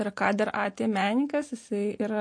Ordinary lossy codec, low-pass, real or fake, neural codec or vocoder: MP3, 48 kbps; 9.9 kHz; real; none